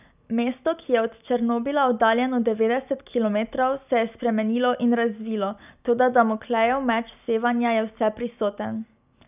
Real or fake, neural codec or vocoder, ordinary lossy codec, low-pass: real; none; none; 3.6 kHz